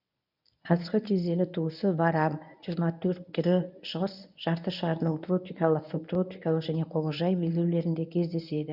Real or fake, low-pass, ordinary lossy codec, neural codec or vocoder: fake; 5.4 kHz; none; codec, 24 kHz, 0.9 kbps, WavTokenizer, medium speech release version 1